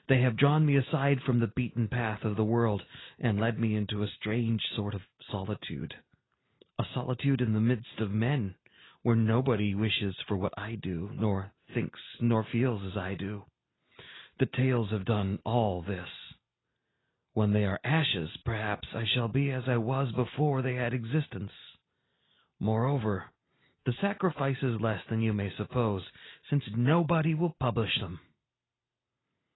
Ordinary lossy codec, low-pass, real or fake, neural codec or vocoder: AAC, 16 kbps; 7.2 kHz; real; none